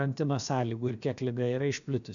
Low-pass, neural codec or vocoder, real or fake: 7.2 kHz; codec, 16 kHz, about 1 kbps, DyCAST, with the encoder's durations; fake